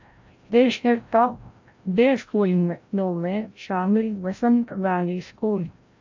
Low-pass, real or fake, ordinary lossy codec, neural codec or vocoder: 7.2 kHz; fake; AAC, 48 kbps; codec, 16 kHz, 0.5 kbps, FreqCodec, larger model